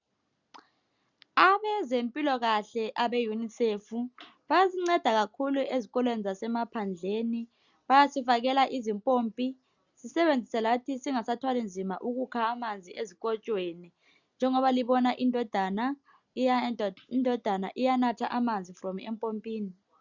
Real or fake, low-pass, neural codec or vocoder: real; 7.2 kHz; none